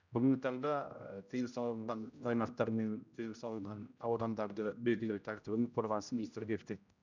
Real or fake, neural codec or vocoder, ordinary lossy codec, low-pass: fake; codec, 16 kHz, 0.5 kbps, X-Codec, HuBERT features, trained on general audio; none; 7.2 kHz